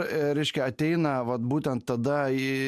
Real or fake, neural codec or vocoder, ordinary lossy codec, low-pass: real; none; MP3, 96 kbps; 14.4 kHz